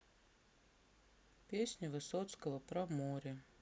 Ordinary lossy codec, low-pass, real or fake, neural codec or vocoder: none; none; real; none